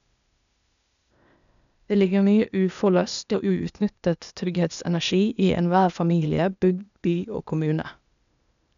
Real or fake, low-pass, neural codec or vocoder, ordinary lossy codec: fake; 7.2 kHz; codec, 16 kHz, 0.8 kbps, ZipCodec; none